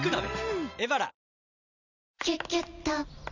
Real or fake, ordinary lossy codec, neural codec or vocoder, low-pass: real; none; none; 7.2 kHz